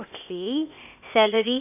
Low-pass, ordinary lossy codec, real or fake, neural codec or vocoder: 3.6 kHz; none; fake; codec, 16 kHz, 0.8 kbps, ZipCodec